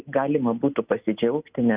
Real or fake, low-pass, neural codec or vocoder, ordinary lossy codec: real; 3.6 kHz; none; Opus, 64 kbps